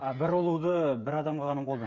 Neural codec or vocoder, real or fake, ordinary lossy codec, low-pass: codec, 16 kHz, 8 kbps, FreqCodec, smaller model; fake; none; 7.2 kHz